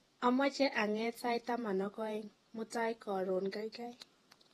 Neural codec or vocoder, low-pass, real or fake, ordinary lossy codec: vocoder, 48 kHz, 128 mel bands, Vocos; 19.8 kHz; fake; AAC, 32 kbps